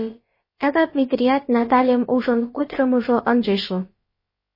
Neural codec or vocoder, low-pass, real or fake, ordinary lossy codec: codec, 16 kHz, about 1 kbps, DyCAST, with the encoder's durations; 5.4 kHz; fake; MP3, 24 kbps